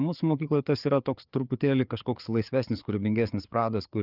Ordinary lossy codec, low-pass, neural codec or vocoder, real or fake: Opus, 24 kbps; 5.4 kHz; codec, 16 kHz, 4 kbps, FreqCodec, larger model; fake